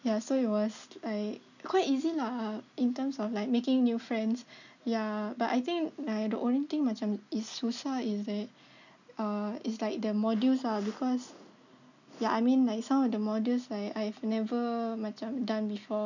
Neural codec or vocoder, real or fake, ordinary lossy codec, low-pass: none; real; none; 7.2 kHz